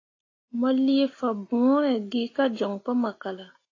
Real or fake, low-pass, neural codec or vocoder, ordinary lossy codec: real; 7.2 kHz; none; AAC, 32 kbps